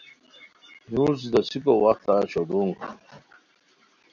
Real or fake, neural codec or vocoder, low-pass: real; none; 7.2 kHz